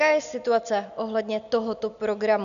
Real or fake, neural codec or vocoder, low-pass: real; none; 7.2 kHz